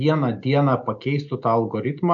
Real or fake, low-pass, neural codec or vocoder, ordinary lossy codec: real; 7.2 kHz; none; AAC, 64 kbps